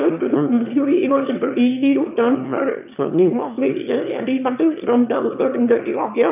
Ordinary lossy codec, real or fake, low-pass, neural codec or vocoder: none; fake; 3.6 kHz; autoencoder, 22.05 kHz, a latent of 192 numbers a frame, VITS, trained on one speaker